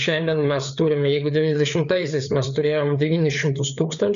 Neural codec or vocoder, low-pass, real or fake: codec, 16 kHz, 4 kbps, FreqCodec, larger model; 7.2 kHz; fake